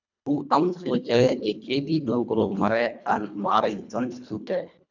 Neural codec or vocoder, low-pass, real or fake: codec, 24 kHz, 1.5 kbps, HILCodec; 7.2 kHz; fake